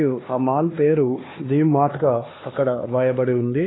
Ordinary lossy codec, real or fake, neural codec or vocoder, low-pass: AAC, 16 kbps; fake; codec, 16 kHz, 2 kbps, X-Codec, HuBERT features, trained on LibriSpeech; 7.2 kHz